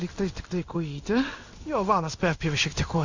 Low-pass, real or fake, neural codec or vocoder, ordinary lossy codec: 7.2 kHz; fake; codec, 16 kHz in and 24 kHz out, 1 kbps, XY-Tokenizer; Opus, 64 kbps